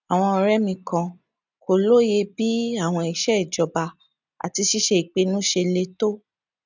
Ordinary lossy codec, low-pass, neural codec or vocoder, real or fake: none; 7.2 kHz; none; real